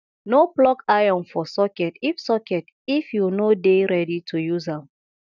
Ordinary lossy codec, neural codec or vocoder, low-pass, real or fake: none; none; 7.2 kHz; real